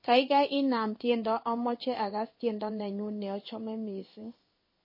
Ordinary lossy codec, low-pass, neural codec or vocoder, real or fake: MP3, 24 kbps; 5.4 kHz; codec, 16 kHz in and 24 kHz out, 1 kbps, XY-Tokenizer; fake